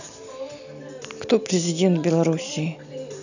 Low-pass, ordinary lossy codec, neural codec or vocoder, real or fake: 7.2 kHz; none; none; real